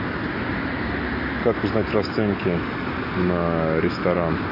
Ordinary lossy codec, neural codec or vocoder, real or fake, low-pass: MP3, 24 kbps; none; real; 5.4 kHz